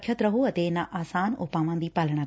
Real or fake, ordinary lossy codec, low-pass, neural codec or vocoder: real; none; none; none